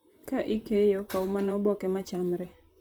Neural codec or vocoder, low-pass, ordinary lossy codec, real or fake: vocoder, 44.1 kHz, 128 mel bands, Pupu-Vocoder; none; none; fake